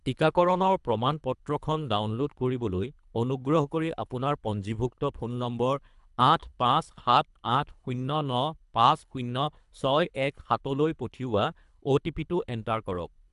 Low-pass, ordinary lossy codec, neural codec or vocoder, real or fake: 10.8 kHz; none; codec, 24 kHz, 3 kbps, HILCodec; fake